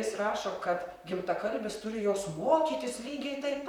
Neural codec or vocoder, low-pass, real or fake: vocoder, 44.1 kHz, 128 mel bands, Pupu-Vocoder; 19.8 kHz; fake